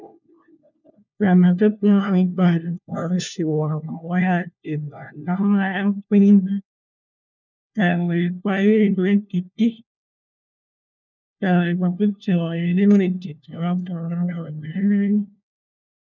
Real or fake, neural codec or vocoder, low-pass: fake; codec, 16 kHz, 1 kbps, FunCodec, trained on LibriTTS, 50 frames a second; 7.2 kHz